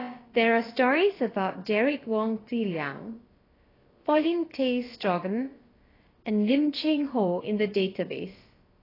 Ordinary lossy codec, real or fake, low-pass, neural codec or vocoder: AAC, 24 kbps; fake; 5.4 kHz; codec, 16 kHz, about 1 kbps, DyCAST, with the encoder's durations